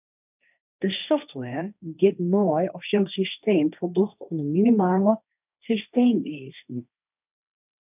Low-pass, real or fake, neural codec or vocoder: 3.6 kHz; fake; codec, 16 kHz, 1.1 kbps, Voila-Tokenizer